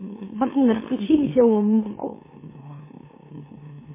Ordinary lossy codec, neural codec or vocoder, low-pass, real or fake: MP3, 16 kbps; autoencoder, 44.1 kHz, a latent of 192 numbers a frame, MeloTTS; 3.6 kHz; fake